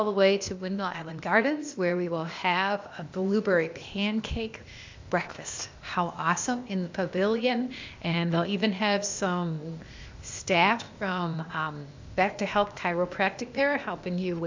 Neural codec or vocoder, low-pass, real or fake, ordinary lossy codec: codec, 16 kHz, 0.8 kbps, ZipCodec; 7.2 kHz; fake; MP3, 64 kbps